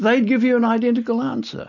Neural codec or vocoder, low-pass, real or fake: none; 7.2 kHz; real